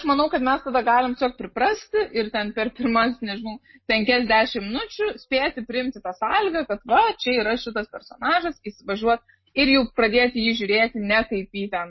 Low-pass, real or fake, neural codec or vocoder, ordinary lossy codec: 7.2 kHz; real; none; MP3, 24 kbps